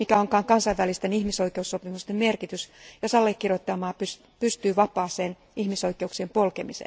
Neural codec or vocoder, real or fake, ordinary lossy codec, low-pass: none; real; none; none